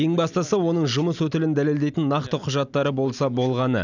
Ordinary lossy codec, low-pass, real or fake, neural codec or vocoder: none; 7.2 kHz; real; none